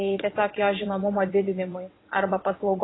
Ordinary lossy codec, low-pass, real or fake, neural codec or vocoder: AAC, 16 kbps; 7.2 kHz; fake; vocoder, 22.05 kHz, 80 mel bands, WaveNeXt